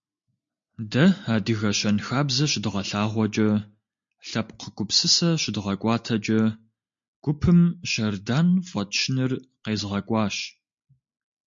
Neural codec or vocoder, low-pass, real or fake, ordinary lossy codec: none; 7.2 kHz; real; MP3, 48 kbps